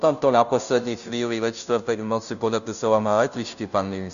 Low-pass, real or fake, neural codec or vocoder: 7.2 kHz; fake; codec, 16 kHz, 0.5 kbps, FunCodec, trained on Chinese and English, 25 frames a second